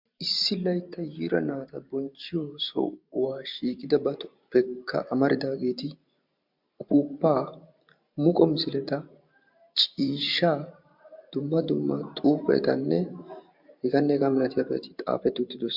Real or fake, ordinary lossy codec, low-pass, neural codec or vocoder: fake; MP3, 48 kbps; 5.4 kHz; vocoder, 44.1 kHz, 128 mel bands every 512 samples, BigVGAN v2